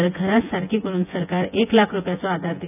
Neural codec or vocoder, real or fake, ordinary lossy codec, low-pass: vocoder, 24 kHz, 100 mel bands, Vocos; fake; none; 3.6 kHz